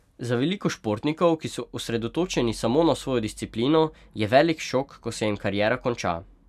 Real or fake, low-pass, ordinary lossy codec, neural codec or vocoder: real; 14.4 kHz; none; none